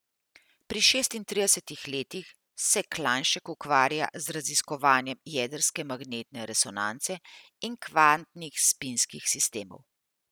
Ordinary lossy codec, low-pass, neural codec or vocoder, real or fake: none; none; none; real